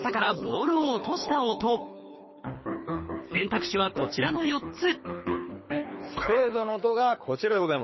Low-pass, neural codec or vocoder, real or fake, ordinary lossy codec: 7.2 kHz; codec, 24 kHz, 3 kbps, HILCodec; fake; MP3, 24 kbps